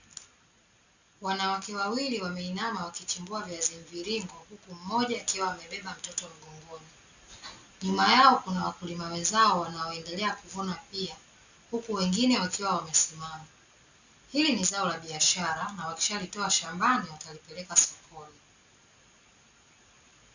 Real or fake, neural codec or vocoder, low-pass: real; none; 7.2 kHz